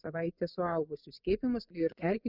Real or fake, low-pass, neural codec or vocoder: fake; 5.4 kHz; vocoder, 24 kHz, 100 mel bands, Vocos